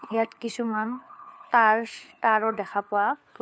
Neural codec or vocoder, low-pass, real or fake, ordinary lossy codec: codec, 16 kHz, 4 kbps, FunCodec, trained on LibriTTS, 50 frames a second; none; fake; none